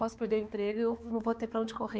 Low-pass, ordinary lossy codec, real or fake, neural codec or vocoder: none; none; fake; codec, 16 kHz, 4 kbps, X-Codec, HuBERT features, trained on balanced general audio